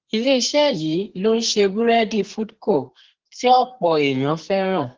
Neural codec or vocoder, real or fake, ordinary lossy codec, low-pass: codec, 32 kHz, 1.9 kbps, SNAC; fake; Opus, 16 kbps; 7.2 kHz